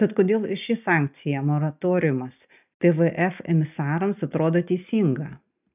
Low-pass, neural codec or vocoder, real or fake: 3.6 kHz; none; real